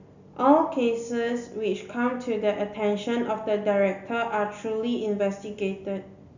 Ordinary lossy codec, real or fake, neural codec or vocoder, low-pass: none; real; none; 7.2 kHz